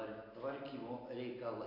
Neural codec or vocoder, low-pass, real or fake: none; 5.4 kHz; real